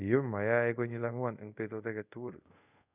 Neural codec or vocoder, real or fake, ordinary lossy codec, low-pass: codec, 24 kHz, 0.5 kbps, DualCodec; fake; none; 3.6 kHz